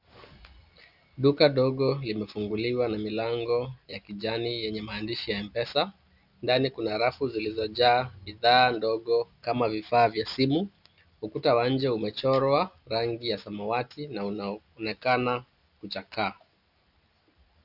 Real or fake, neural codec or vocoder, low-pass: real; none; 5.4 kHz